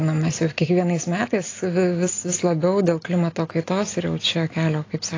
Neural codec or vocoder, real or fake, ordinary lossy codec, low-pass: none; real; AAC, 32 kbps; 7.2 kHz